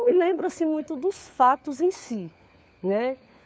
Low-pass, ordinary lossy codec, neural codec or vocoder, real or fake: none; none; codec, 16 kHz, 4 kbps, FunCodec, trained on LibriTTS, 50 frames a second; fake